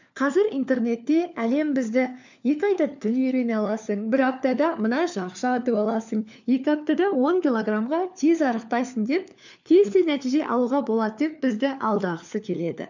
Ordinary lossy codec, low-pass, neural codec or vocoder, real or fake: none; 7.2 kHz; codec, 16 kHz, 4 kbps, FunCodec, trained on LibriTTS, 50 frames a second; fake